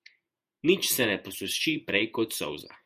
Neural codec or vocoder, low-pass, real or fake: vocoder, 48 kHz, 128 mel bands, Vocos; 14.4 kHz; fake